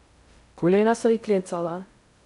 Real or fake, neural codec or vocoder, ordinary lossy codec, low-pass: fake; codec, 16 kHz in and 24 kHz out, 0.6 kbps, FocalCodec, streaming, 2048 codes; none; 10.8 kHz